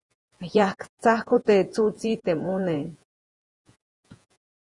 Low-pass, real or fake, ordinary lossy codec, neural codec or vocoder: 10.8 kHz; fake; Opus, 64 kbps; vocoder, 48 kHz, 128 mel bands, Vocos